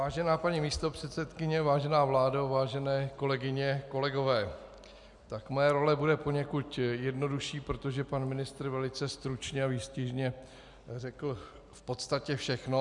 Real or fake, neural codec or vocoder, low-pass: real; none; 10.8 kHz